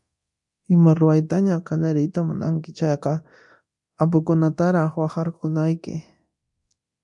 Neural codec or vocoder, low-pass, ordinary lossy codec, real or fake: codec, 24 kHz, 0.9 kbps, DualCodec; 10.8 kHz; MP3, 64 kbps; fake